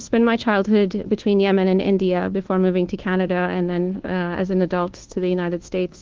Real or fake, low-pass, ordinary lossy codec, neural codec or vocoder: fake; 7.2 kHz; Opus, 16 kbps; codec, 24 kHz, 1.2 kbps, DualCodec